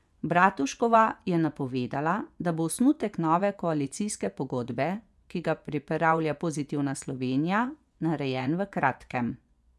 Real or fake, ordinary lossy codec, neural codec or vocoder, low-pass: fake; none; vocoder, 24 kHz, 100 mel bands, Vocos; none